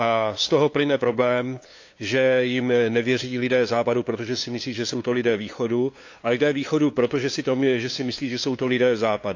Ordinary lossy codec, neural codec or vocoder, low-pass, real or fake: AAC, 48 kbps; codec, 16 kHz, 2 kbps, FunCodec, trained on LibriTTS, 25 frames a second; 7.2 kHz; fake